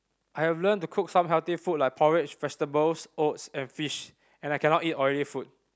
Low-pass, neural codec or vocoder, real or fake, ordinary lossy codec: none; none; real; none